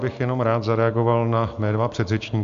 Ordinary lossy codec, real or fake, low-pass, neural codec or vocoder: MP3, 64 kbps; real; 7.2 kHz; none